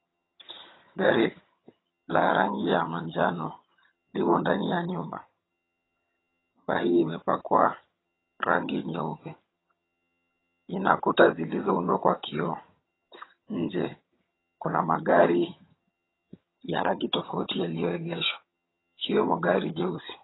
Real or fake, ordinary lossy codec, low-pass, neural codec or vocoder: fake; AAC, 16 kbps; 7.2 kHz; vocoder, 22.05 kHz, 80 mel bands, HiFi-GAN